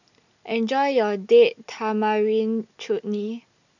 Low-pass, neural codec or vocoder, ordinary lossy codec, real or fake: 7.2 kHz; none; none; real